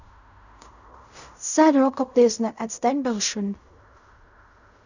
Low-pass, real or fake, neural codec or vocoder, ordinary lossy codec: 7.2 kHz; fake; codec, 16 kHz in and 24 kHz out, 0.4 kbps, LongCat-Audio-Codec, fine tuned four codebook decoder; none